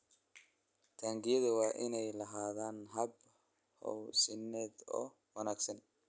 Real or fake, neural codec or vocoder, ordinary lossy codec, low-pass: real; none; none; none